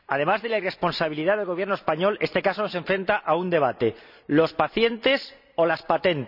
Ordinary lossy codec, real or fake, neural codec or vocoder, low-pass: none; real; none; 5.4 kHz